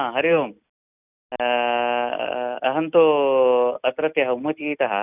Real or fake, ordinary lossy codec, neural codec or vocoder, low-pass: real; none; none; 3.6 kHz